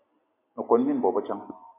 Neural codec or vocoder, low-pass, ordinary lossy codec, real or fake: none; 3.6 kHz; MP3, 24 kbps; real